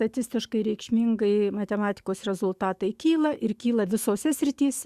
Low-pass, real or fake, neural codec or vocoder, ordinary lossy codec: 14.4 kHz; fake; autoencoder, 48 kHz, 128 numbers a frame, DAC-VAE, trained on Japanese speech; Opus, 64 kbps